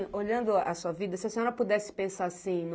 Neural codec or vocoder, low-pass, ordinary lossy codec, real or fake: none; none; none; real